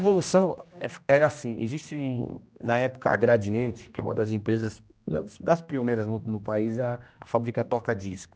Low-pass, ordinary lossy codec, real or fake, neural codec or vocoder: none; none; fake; codec, 16 kHz, 1 kbps, X-Codec, HuBERT features, trained on general audio